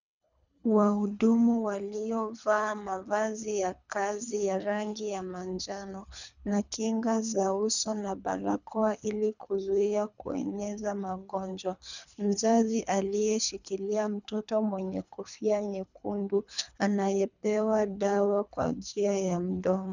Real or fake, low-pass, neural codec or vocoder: fake; 7.2 kHz; codec, 24 kHz, 3 kbps, HILCodec